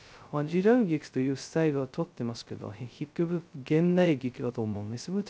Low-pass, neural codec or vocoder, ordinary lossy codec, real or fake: none; codec, 16 kHz, 0.2 kbps, FocalCodec; none; fake